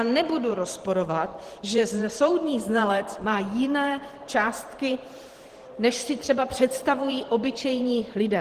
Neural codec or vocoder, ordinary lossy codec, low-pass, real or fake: vocoder, 44.1 kHz, 128 mel bands every 512 samples, BigVGAN v2; Opus, 16 kbps; 14.4 kHz; fake